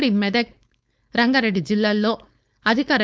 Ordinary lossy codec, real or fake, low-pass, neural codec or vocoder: none; fake; none; codec, 16 kHz, 4.8 kbps, FACodec